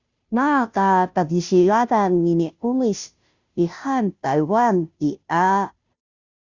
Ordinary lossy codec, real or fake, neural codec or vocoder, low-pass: Opus, 64 kbps; fake; codec, 16 kHz, 0.5 kbps, FunCodec, trained on Chinese and English, 25 frames a second; 7.2 kHz